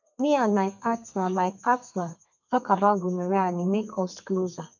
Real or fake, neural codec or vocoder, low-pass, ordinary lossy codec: fake; codec, 32 kHz, 1.9 kbps, SNAC; 7.2 kHz; none